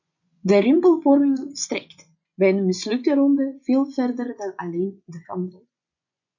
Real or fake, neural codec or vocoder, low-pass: fake; vocoder, 24 kHz, 100 mel bands, Vocos; 7.2 kHz